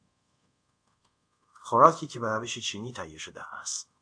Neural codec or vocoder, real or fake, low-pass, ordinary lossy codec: codec, 24 kHz, 0.5 kbps, DualCodec; fake; 9.9 kHz; AAC, 64 kbps